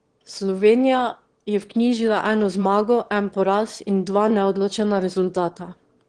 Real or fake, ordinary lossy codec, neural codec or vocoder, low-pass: fake; Opus, 16 kbps; autoencoder, 22.05 kHz, a latent of 192 numbers a frame, VITS, trained on one speaker; 9.9 kHz